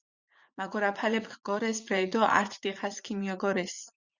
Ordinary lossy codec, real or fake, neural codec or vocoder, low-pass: Opus, 64 kbps; fake; vocoder, 44.1 kHz, 80 mel bands, Vocos; 7.2 kHz